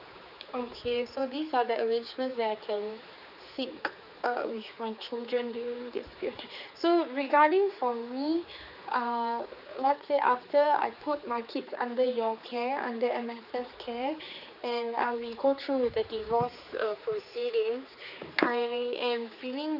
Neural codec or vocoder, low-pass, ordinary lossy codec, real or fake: codec, 16 kHz, 4 kbps, X-Codec, HuBERT features, trained on general audio; 5.4 kHz; none; fake